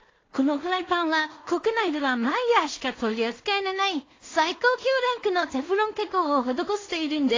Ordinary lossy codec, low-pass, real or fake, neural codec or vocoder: AAC, 32 kbps; 7.2 kHz; fake; codec, 16 kHz in and 24 kHz out, 0.4 kbps, LongCat-Audio-Codec, two codebook decoder